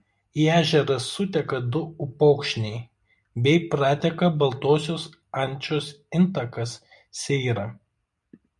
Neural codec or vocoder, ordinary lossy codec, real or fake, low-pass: none; MP3, 96 kbps; real; 10.8 kHz